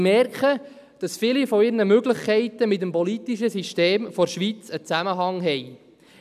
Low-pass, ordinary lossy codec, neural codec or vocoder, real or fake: 14.4 kHz; none; none; real